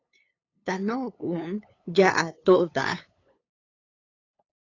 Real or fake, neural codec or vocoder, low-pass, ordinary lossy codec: fake; codec, 16 kHz, 8 kbps, FunCodec, trained on LibriTTS, 25 frames a second; 7.2 kHz; AAC, 32 kbps